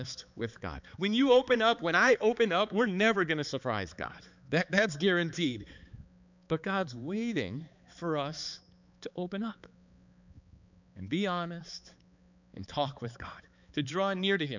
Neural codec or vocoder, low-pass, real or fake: codec, 16 kHz, 4 kbps, X-Codec, HuBERT features, trained on balanced general audio; 7.2 kHz; fake